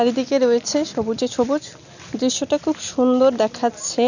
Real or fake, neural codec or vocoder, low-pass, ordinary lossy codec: real; none; 7.2 kHz; none